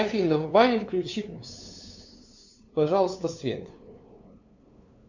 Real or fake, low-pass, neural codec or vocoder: fake; 7.2 kHz; codec, 16 kHz, 2 kbps, FunCodec, trained on LibriTTS, 25 frames a second